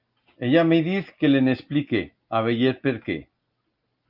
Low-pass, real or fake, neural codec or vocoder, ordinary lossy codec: 5.4 kHz; real; none; Opus, 24 kbps